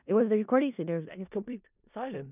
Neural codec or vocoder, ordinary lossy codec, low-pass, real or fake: codec, 16 kHz in and 24 kHz out, 0.4 kbps, LongCat-Audio-Codec, four codebook decoder; none; 3.6 kHz; fake